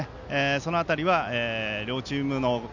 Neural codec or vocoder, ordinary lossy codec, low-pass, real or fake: none; none; 7.2 kHz; real